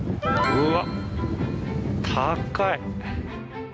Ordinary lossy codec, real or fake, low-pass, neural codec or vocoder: none; real; none; none